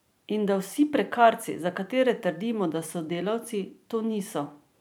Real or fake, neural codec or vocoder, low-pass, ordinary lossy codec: fake; vocoder, 44.1 kHz, 128 mel bands every 512 samples, BigVGAN v2; none; none